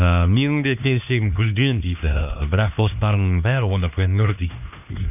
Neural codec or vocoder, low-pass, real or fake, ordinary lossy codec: codec, 16 kHz, 2 kbps, X-Codec, HuBERT features, trained on LibriSpeech; 3.6 kHz; fake; none